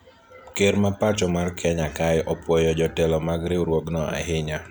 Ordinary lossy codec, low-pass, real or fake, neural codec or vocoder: none; none; real; none